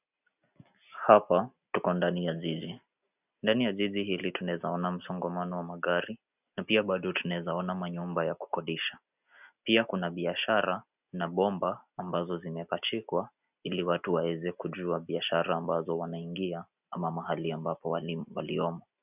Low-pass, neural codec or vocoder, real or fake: 3.6 kHz; none; real